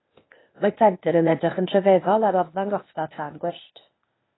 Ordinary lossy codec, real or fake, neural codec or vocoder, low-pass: AAC, 16 kbps; fake; codec, 16 kHz, 0.8 kbps, ZipCodec; 7.2 kHz